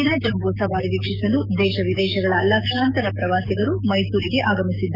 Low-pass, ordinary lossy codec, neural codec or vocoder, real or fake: 5.4 kHz; none; codec, 44.1 kHz, 7.8 kbps, DAC; fake